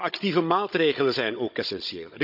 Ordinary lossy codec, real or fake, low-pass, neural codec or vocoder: none; fake; 5.4 kHz; codec, 16 kHz, 16 kbps, FunCodec, trained on Chinese and English, 50 frames a second